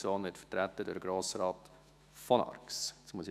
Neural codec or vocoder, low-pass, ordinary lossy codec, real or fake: autoencoder, 48 kHz, 128 numbers a frame, DAC-VAE, trained on Japanese speech; 14.4 kHz; none; fake